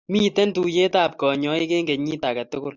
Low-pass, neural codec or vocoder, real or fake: 7.2 kHz; none; real